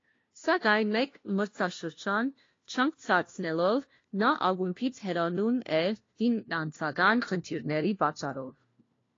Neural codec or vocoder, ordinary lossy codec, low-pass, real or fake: codec, 16 kHz, 1 kbps, FunCodec, trained on LibriTTS, 50 frames a second; AAC, 32 kbps; 7.2 kHz; fake